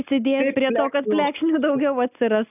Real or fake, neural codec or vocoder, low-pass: real; none; 3.6 kHz